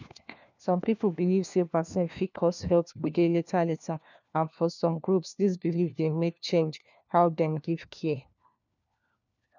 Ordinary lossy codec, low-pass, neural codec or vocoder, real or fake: none; 7.2 kHz; codec, 16 kHz, 1 kbps, FunCodec, trained on LibriTTS, 50 frames a second; fake